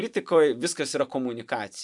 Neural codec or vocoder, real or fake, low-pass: vocoder, 44.1 kHz, 128 mel bands every 256 samples, BigVGAN v2; fake; 10.8 kHz